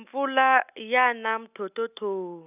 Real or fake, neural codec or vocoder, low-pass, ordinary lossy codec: real; none; 3.6 kHz; none